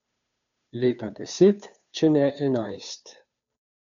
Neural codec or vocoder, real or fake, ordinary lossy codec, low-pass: codec, 16 kHz, 2 kbps, FunCodec, trained on Chinese and English, 25 frames a second; fake; none; 7.2 kHz